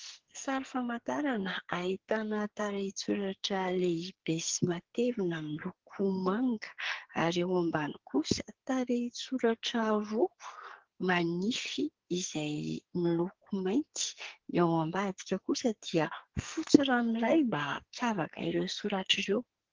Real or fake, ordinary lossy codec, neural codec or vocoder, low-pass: fake; Opus, 16 kbps; codec, 44.1 kHz, 2.6 kbps, SNAC; 7.2 kHz